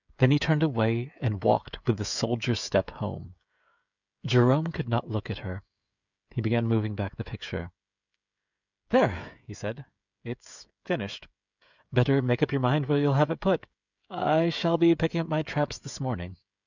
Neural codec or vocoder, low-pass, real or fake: codec, 16 kHz, 16 kbps, FreqCodec, smaller model; 7.2 kHz; fake